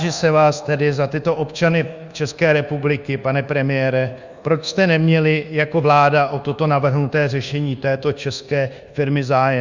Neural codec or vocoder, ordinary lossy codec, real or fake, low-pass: codec, 24 kHz, 1.2 kbps, DualCodec; Opus, 64 kbps; fake; 7.2 kHz